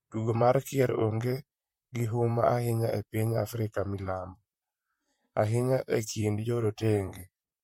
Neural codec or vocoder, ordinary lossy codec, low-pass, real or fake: vocoder, 44.1 kHz, 128 mel bands, Pupu-Vocoder; MP3, 64 kbps; 19.8 kHz; fake